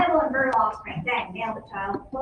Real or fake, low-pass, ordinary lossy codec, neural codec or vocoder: real; 9.9 kHz; Opus, 24 kbps; none